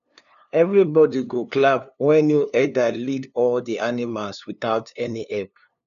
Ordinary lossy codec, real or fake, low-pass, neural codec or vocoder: none; fake; 7.2 kHz; codec, 16 kHz, 2 kbps, FunCodec, trained on LibriTTS, 25 frames a second